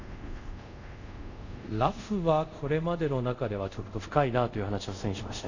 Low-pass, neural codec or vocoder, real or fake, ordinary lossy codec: 7.2 kHz; codec, 24 kHz, 0.5 kbps, DualCodec; fake; none